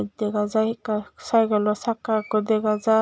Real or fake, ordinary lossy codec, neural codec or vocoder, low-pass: real; none; none; none